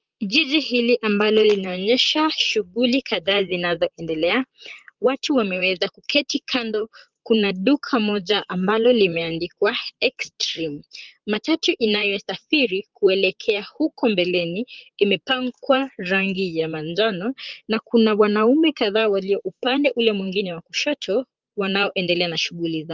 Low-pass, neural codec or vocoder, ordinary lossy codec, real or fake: 7.2 kHz; vocoder, 44.1 kHz, 128 mel bands, Pupu-Vocoder; Opus, 32 kbps; fake